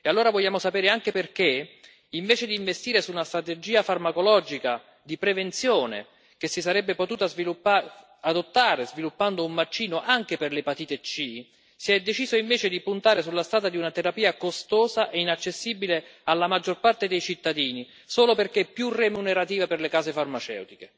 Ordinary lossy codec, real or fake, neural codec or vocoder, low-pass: none; real; none; none